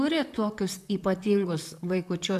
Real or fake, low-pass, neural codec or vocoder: fake; 14.4 kHz; vocoder, 44.1 kHz, 128 mel bands, Pupu-Vocoder